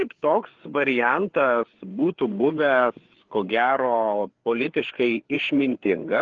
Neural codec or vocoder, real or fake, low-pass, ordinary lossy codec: codec, 16 kHz, 4 kbps, FreqCodec, larger model; fake; 7.2 kHz; Opus, 16 kbps